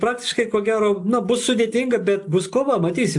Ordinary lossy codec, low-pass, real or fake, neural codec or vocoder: AAC, 64 kbps; 10.8 kHz; real; none